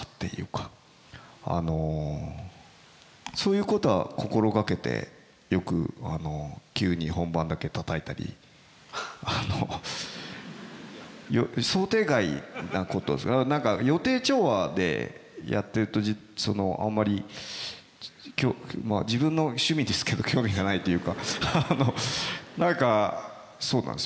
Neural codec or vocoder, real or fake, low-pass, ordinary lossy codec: none; real; none; none